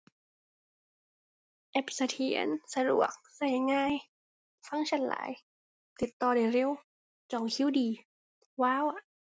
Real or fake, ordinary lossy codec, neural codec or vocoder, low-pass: real; none; none; none